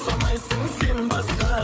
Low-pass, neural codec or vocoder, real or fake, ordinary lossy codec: none; codec, 16 kHz, 8 kbps, FreqCodec, larger model; fake; none